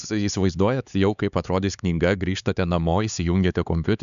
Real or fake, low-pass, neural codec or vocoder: fake; 7.2 kHz; codec, 16 kHz, 4 kbps, X-Codec, HuBERT features, trained on LibriSpeech